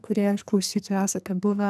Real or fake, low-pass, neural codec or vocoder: fake; 14.4 kHz; codec, 44.1 kHz, 2.6 kbps, SNAC